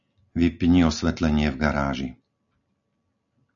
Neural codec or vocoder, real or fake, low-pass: none; real; 7.2 kHz